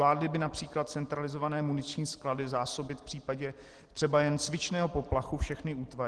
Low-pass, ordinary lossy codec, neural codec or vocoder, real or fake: 10.8 kHz; Opus, 16 kbps; none; real